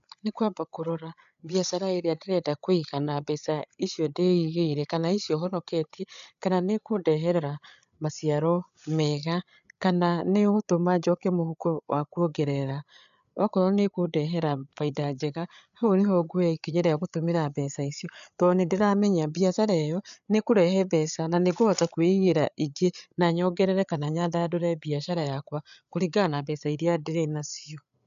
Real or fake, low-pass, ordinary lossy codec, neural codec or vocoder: fake; 7.2 kHz; none; codec, 16 kHz, 8 kbps, FreqCodec, larger model